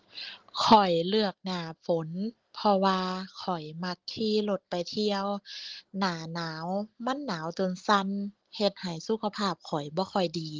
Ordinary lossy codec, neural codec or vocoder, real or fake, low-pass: Opus, 16 kbps; none; real; 7.2 kHz